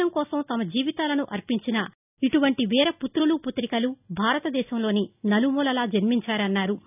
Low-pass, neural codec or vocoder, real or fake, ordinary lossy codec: 3.6 kHz; none; real; none